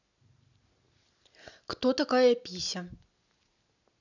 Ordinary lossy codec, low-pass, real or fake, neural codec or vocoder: none; 7.2 kHz; real; none